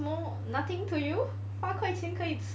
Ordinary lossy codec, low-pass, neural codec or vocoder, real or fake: none; none; none; real